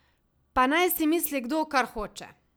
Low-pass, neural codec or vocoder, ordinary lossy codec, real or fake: none; none; none; real